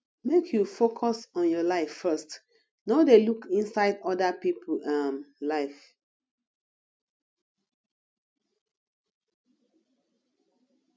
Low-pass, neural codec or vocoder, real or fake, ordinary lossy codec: none; none; real; none